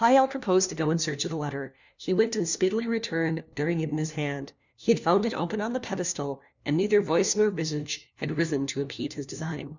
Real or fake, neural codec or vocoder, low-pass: fake; codec, 16 kHz, 1 kbps, FunCodec, trained on LibriTTS, 50 frames a second; 7.2 kHz